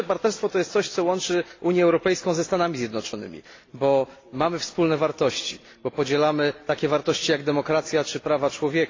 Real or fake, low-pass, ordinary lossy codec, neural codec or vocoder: real; 7.2 kHz; AAC, 32 kbps; none